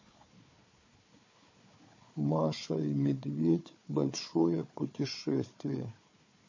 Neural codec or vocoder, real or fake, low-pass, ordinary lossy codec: codec, 16 kHz, 4 kbps, FunCodec, trained on Chinese and English, 50 frames a second; fake; 7.2 kHz; MP3, 32 kbps